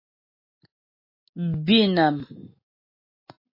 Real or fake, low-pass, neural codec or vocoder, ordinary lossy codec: real; 5.4 kHz; none; MP3, 24 kbps